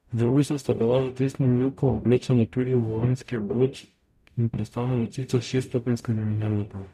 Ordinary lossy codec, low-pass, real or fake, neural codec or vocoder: none; 14.4 kHz; fake; codec, 44.1 kHz, 0.9 kbps, DAC